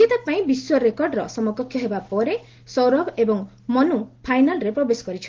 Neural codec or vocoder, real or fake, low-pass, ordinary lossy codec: none; real; 7.2 kHz; Opus, 16 kbps